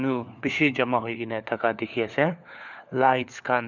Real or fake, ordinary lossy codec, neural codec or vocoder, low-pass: fake; none; codec, 16 kHz, 4 kbps, FunCodec, trained on LibriTTS, 50 frames a second; 7.2 kHz